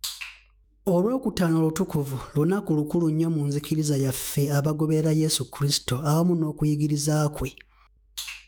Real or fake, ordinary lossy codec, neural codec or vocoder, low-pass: fake; none; autoencoder, 48 kHz, 128 numbers a frame, DAC-VAE, trained on Japanese speech; none